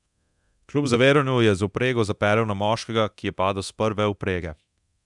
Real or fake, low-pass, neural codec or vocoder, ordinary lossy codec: fake; 10.8 kHz; codec, 24 kHz, 0.9 kbps, DualCodec; none